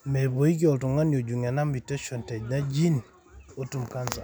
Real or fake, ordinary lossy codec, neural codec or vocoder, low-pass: real; none; none; none